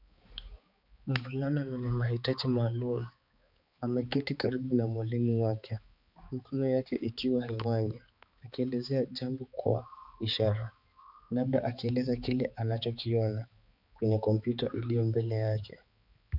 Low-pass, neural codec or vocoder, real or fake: 5.4 kHz; codec, 16 kHz, 4 kbps, X-Codec, HuBERT features, trained on balanced general audio; fake